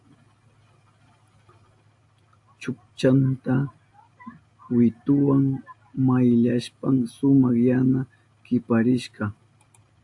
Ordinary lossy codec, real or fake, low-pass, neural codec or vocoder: AAC, 64 kbps; real; 10.8 kHz; none